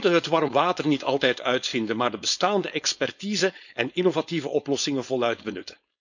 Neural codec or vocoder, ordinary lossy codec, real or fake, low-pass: codec, 16 kHz, 4.8 kbps, FACodec; none; fake; 7.2 kHz